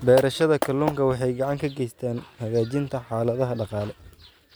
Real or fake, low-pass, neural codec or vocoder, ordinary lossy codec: real; none; none; none